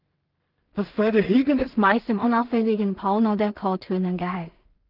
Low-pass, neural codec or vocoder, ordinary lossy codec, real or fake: 5.4 kHz; codec, 16 kHz in and 24 kHz out, 0.4 kbps, LongCat-Audio-Codec, two codebook decoder; Opus, 32 kbps; fake